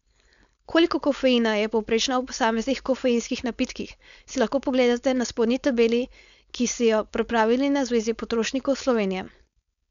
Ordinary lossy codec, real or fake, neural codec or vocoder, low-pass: none; fake; codec, 16 kHz, 4.8 kbps, FACodec; 7.2 kHz